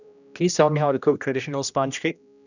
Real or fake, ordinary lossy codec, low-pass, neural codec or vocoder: fake; none; 7.2 kHz; codec, 16 kHz, 1 kbps, X-Codec, HuBERT features, trained on general audio